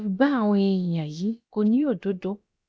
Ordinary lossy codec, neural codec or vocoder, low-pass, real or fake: none; codec, 16 kHz, about 1 kbps, DyCAST, with the encoder's durations; none; fake